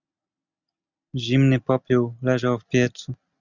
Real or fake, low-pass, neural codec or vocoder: real; 7.2 kHz; none